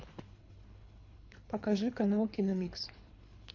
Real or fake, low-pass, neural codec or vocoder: fake; 7.2 kHz; codec, 24 kHz, 3 kbps, HILCodec